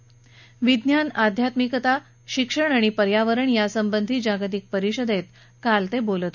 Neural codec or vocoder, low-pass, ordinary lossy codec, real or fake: none; 7.2 kHz; none; real